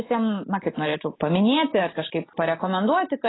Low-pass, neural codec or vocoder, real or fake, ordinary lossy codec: 7.2 kHz; none; real; AAC, 16 kbps